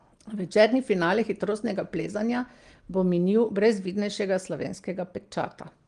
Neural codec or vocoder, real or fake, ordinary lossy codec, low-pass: none; real; Opus, 24 kbps; 9.9 kHz